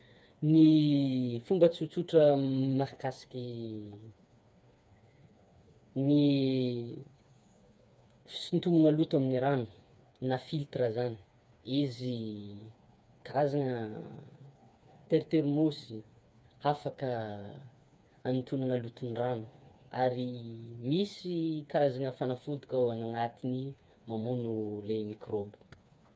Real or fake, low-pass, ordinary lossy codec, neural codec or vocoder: fake; none; none; codec, 16 kHz, 4 kbps, FreqCodec, smaller model